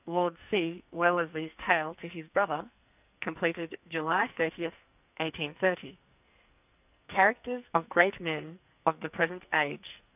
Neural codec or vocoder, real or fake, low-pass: codec, 44.1 kHz, 2.6 kbps, SNAC; fake; 3.6 kHz